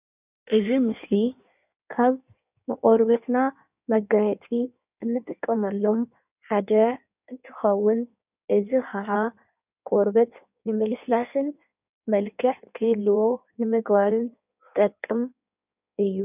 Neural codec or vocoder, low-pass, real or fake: codec, 16 kHz in and 24 kHz out, 1.1 kbps, FireRedTTS-2 codec; 3.6 kHz; fake